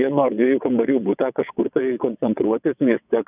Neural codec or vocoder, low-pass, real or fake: vocoder, 22.05 kHz, 80 mel bands, WaveNeXt; 3.6 kHz; fake